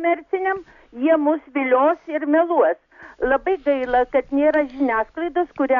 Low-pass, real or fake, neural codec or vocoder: 7.2 kHz; real; none